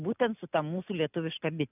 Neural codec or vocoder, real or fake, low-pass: none; real; 3.6 kHz